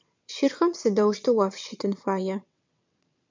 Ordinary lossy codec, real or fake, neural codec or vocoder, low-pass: MP3, 64 kbps; fake; vocoder, 22.05 kHz, 80 mel bands, WaveNeXt; 7.2 kHz